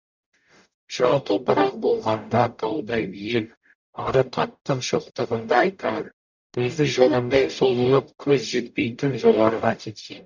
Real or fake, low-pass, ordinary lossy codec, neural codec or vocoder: fake; 7.2 kHz; none; codec, 44.1 kHz, 0.9 kbps, DAC